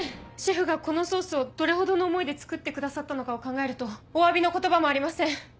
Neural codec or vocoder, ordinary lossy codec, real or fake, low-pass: none; none; real; none